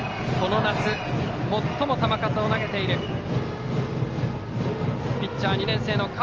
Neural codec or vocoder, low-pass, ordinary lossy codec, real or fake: none; 7.2 kHz; Opus, 24 kbps; real